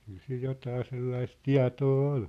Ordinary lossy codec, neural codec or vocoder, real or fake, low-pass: none; none; real; 14.4 kHz